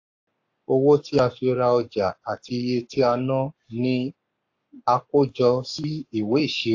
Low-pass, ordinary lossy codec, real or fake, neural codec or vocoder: 7.2 kHz; AAC, 48 kbps; fake; codec, 44.1 kHz, 7.8 kbps, Pupu-Codec